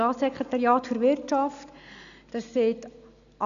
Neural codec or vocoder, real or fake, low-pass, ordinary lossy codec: none; real; 7.2 kHz; none